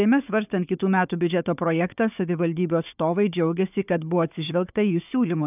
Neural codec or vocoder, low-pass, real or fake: codec, 16 kHz, 4 kbps, FunCodec, trained on Chinese and English, 50 frames a second; 3.6 kHz; fake